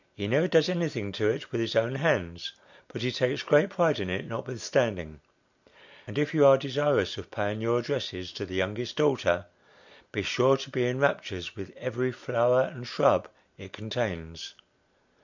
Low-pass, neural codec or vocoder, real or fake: 7.2 kHz; none; real